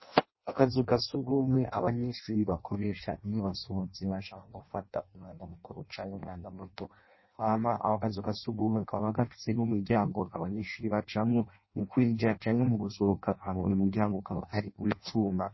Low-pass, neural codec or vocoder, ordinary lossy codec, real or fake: 7.2 kHz; codec, 16 kHz in and 24 kHz out, 0.6 kbps, FireRedTTS-2 codec; MP3, 24 kbps; fake